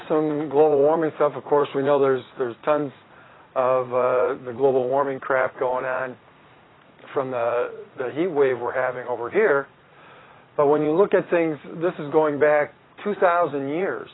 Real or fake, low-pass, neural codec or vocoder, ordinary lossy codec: fake; 7.2 kHz; vocoder, 44.1 kHz, 80 mel bands, Vocos; AAC, 16 kbps